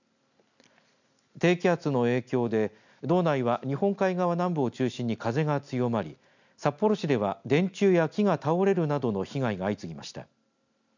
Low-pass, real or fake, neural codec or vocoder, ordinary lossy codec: 7.2 kHz; real; none; none